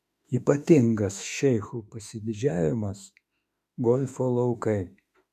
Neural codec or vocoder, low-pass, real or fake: autoencoder, 48 kHz, 32 numbers a frame, DAC-VAE, trained on Japanese speech; 14.4 kHz; fake